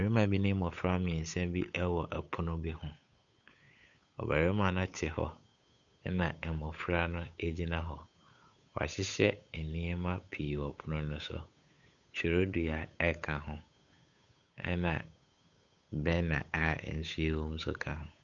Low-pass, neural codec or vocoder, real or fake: 7.2 kHz; codec, 16 kHz, 8 kbps, FunCodec, trained on Chinese and English, 25 frames a second; fake